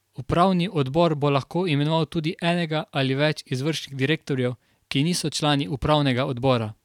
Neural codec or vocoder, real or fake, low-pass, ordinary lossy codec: vocoder, 44.1 kHz, 128 mel bands every 512 samples, BigVGAN v2; fake; 19.8 kHz; none